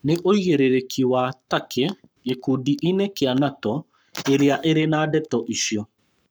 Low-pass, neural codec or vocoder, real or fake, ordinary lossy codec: none; codec, 44.1 kHz, 7.8 kbps, Pupu-Codec; fake; none